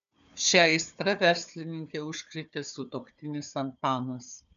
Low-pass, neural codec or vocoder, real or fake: 7.2 kHz; codec, 16 kHz, 4 kbps, FunCodec, trained on Chinese and English, 50 frames a second; fake